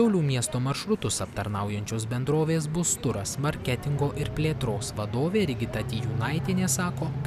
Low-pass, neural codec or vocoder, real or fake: 14.4 kHz; none; real